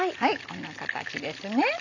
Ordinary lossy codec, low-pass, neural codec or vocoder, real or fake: none; 7.2 kHz; none; real